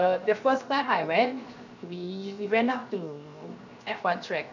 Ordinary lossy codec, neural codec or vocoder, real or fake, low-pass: none; codec, 16 kHz, 0.7 kbps, FocalCodec; fake; 7.2 kHz